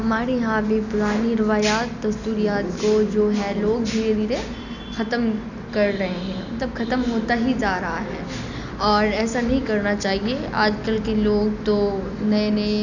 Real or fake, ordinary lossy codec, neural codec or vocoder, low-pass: real; none; none; 7.2 kHz